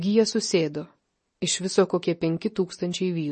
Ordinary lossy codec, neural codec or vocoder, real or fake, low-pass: MP3, 32 kbps; none; real; 10.8 kHz